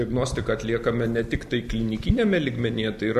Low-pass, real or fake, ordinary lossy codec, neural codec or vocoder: 14.4 kHz; real; AAC, 96 kbps; none